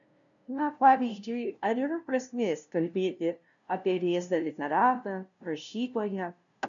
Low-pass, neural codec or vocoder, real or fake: 7.2 kHz; codec, 16 kHz, 0.5 kbps, FunCodec, trained on LibriTTS, 25 frames a second; fake